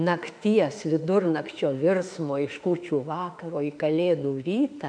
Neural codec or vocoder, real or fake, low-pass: autoencoder, 48 kHz, 32 numbers a frame, DAC-VAE, trained on Japanese speech; fake; 9.9 kHz